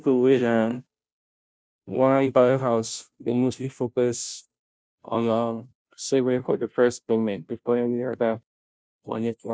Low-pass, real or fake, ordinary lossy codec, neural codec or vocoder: none; fake; none; codec, 16 kHz, 0.5 kbps, FunCodec, trained on Chinese and English, 25 frames a second